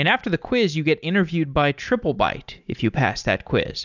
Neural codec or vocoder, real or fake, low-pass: none; real; 7.2 kHz